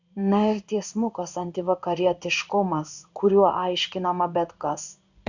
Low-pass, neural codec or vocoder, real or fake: 7.2 kHz; codec, 16 kHz in and 24 kHz out, 1 kbps, XY-Tokenizer; fake